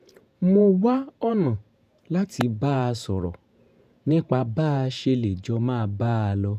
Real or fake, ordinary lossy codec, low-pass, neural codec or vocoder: fake; none; 14.4 kHz; vocoder, 48 kHz, 128 mel bands, Vocos